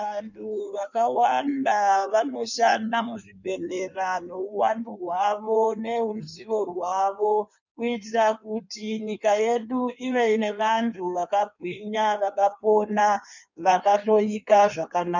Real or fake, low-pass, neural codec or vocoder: fake; 7.2 kHz; codec, 16 kHz in and 24 kHz out, 1.1 kbps, FireRedTTS-2 codec